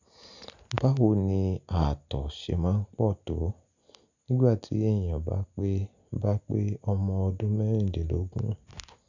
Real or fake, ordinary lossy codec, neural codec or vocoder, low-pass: real; none; none; 7.2 kHz